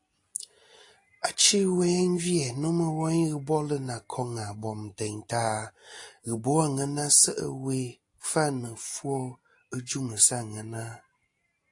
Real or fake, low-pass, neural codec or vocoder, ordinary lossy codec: real; 10.8 kHz; none; AAC, 48 kbps